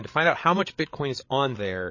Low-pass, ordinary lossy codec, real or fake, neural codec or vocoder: 7.2 kHz; MP3, 32 kbps; fake; codec, 16 kHz, 8 kbps, FreqCodec, larger model